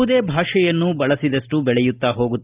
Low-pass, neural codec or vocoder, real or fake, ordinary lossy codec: 3.6 kHz; none; real; Opus, 24 kbps